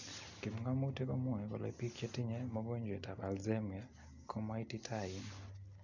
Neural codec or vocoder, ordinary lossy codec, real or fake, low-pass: none; none; real; 7.2 kHz